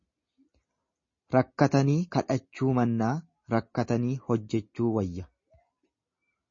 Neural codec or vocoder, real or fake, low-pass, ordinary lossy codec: none; real; 7.2 kHz; MP3, 32 kbps